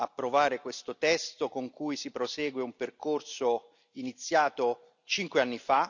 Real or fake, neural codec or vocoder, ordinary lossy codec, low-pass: real; none; none; 7.2 kHz